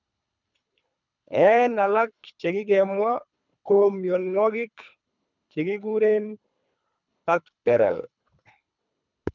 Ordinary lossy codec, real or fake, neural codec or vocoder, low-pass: none; fake; codec, 24 kHz, 3 kbps, HILCodec; 7.2 kHz